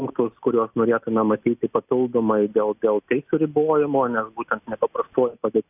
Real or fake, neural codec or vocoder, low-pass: real; none; 3.6 kHz